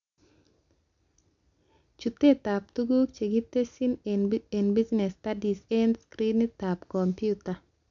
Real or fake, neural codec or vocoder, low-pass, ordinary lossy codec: real; none; 7.2 kHz; MP3, 96 kbps